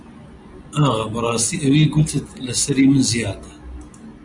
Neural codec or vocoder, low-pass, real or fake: none; 10.8 kHz; real